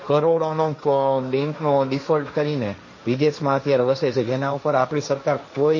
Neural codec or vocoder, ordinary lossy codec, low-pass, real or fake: codec, 16 kHz, 1.1 kbps, Voila-Tokenizer; MP3, 32 kbps; 7.2 kHz; fake